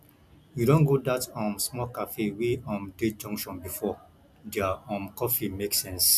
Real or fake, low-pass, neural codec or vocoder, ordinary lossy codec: real; 14.4 kHz; none; none